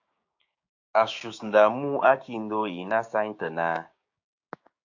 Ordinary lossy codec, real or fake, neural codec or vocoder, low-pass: AAC, 48 kbps; fake; codec, 16 kHz, 6 kbps, DAC; 7.2 kHz